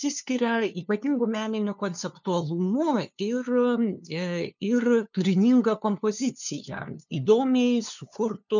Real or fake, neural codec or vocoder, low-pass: fake; codec, 16 kHz, 2 kbps, FunCodec, trained on LibriTTS, 25 frames a second; 7.2 kHz